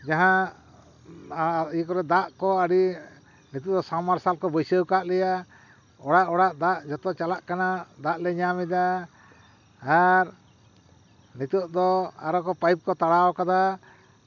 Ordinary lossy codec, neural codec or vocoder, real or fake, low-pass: none; none; real; 7.2 kHz